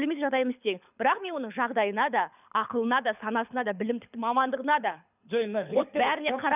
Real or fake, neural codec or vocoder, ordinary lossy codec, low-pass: fake; codec, 24 kHz, 6 kbps, HILCodec; none; 3.6 kHz